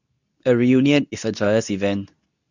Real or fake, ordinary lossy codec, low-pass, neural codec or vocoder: fake; none; 7.2 kHz; codec, 24 kHz, 0.9 kbps, WavTokenizer, medium speech release version 2